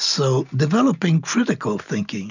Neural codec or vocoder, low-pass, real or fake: none; 7.2 kHz; real